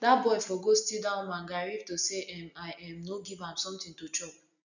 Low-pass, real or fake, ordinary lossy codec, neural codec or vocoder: 7.2 kHz; real; none; none